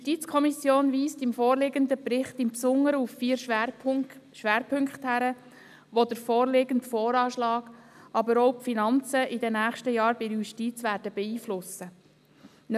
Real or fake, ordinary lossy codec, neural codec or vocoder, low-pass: real; none; none; 14.4 kHz